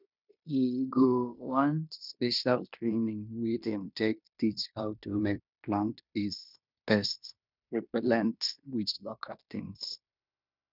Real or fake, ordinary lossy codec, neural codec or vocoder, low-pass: fake; none; codec, 16 kHz in and 24 kHz out, 0.9 kbps, LongCat-Audio-Codec, fine tuned four codebook decoder; 5.4 kHz